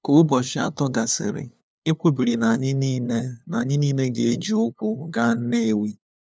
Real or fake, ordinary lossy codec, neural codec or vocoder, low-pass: fake; none; codec, 16 kHz, 2 kbps, FunCodec, trained on LibriTTS, 25 frames a second; none